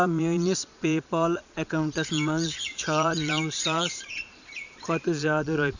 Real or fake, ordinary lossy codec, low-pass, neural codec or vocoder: fake; none; 7.2 kHz; vocoder, 22.05 kHz, 80 mel bands, Vocos